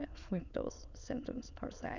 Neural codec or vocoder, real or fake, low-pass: autoencoder, 22.05 kHz, a latent of 192 numbers a frame, VITS, trained on many speakers; fake; 7.2 kHz